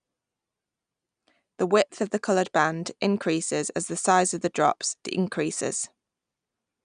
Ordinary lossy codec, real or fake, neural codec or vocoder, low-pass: none; real; none; 9.9 kHz